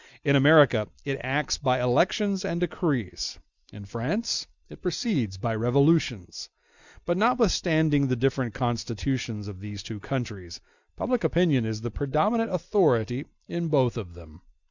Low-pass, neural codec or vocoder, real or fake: 7.2 kHz; none; real